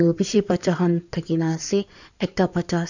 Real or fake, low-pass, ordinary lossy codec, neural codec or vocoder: fake; 7.2 kHz; none; codec, 16 kHz in and 24 kHz out, 2.2 kbps, FireRedTTS-2 codec